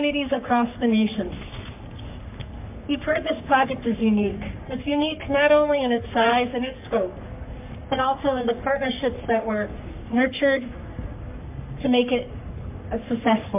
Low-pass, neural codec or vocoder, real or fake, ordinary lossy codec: 3.6 kHz; codec, 44.1 kHz, 3.4 kbps, Pupu-Codec; fake; MP3, 32 kbps